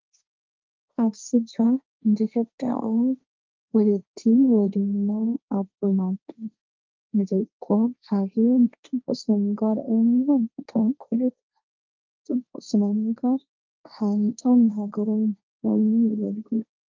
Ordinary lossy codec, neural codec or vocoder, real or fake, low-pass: Opus, 24 kbps; codec, 16 kHz, 1.1 kbps, Voila-Tokenizer; fake; 7.2 kHz